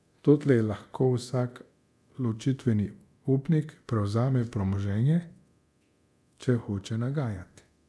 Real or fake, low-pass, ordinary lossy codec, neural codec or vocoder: fake; none; none; codec, 24 kHz, 0.9 kbps, DualCodec